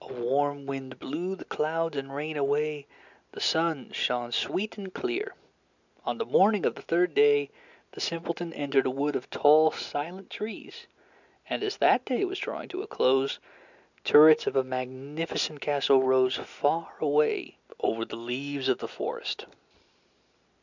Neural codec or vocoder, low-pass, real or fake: none; 7.2 kHz; real